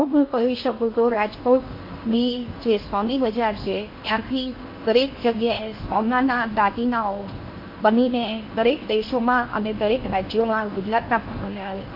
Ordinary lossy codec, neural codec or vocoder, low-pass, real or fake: MP3, 32 kbps; codec, 16 kHz in and 24 kHz out, 0.8 kbps, FocalCodec, streaming, 65536 codes; 5.4 kHz; fake